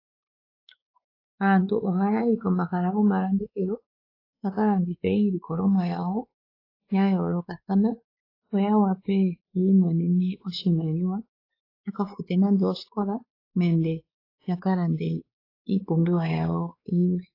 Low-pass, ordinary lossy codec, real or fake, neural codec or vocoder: 5.4 kHz; AAC, 32 kbps; fake; codec, 16 kHz, 4 kbps, X-Codec, WavLM features, trained on Multilingual LibriSpeech